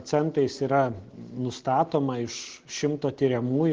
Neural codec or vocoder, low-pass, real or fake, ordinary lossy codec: none; 7.2 kHz; real; Opus, 16 kbps